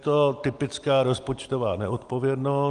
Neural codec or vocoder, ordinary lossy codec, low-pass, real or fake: none; Opus, 24 kbps; 9.9 kHz; real